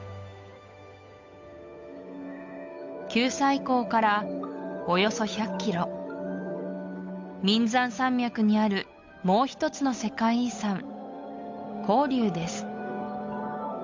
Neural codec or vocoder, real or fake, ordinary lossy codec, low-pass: codec, 16 kHz, 8 kbps, FunCodec, trained on Chinese and English, 25 frames a second; fake; MP3, 64 kbps; 7.2 kHz